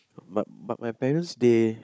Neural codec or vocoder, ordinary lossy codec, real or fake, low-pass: codec, 16 kHz, 16 kbps, FunCodec, trained on Chinese and English, 50 frames a second; none; fake; none